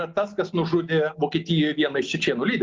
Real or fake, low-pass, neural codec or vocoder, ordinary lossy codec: real; 7.2 kHz; none; Opus, 32 kbps